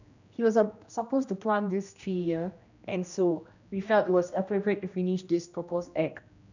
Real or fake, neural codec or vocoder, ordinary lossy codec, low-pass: fake; codec, 16 kHz, 1 kbps, X-Codec, HuBERT features, trained on general audio; none; 7.2 kHz